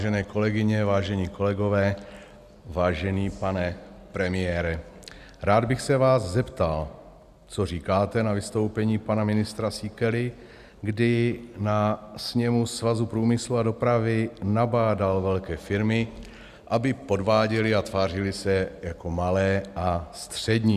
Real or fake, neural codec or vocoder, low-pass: real; none; 14.4 kHz